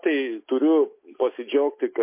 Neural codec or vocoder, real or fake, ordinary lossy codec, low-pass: none; real; MP3, 24 kbps; 3.6 kHz